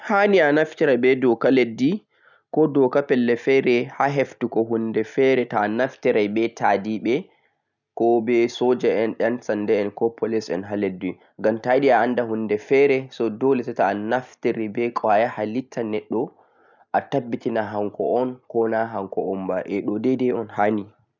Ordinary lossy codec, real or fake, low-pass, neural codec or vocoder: none; real; 7.2 kHz; none